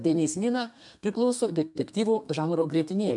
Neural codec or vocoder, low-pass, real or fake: codec, 32 kHz, 1.9 kbps, SNAC; 10.8 kHz; fake